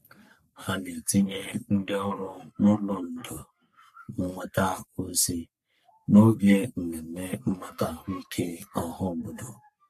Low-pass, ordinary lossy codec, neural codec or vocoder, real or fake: 14.4 kHz; MP3, 64 kbps; codec, 44.1 kHz, 3.4 kbps, Pupu-Codec; fake